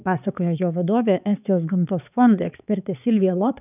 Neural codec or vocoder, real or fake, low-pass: codec, 16 kHz, 4 kbps, X-Codec, HuBERT features, trained on balanced general audio; fake; 3.6 kHz